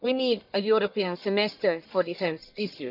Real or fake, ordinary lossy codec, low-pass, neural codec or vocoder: fake; none; 5.4 kHz; codec, 44.1 kHz, 1.7 kbps, Pupu-Codec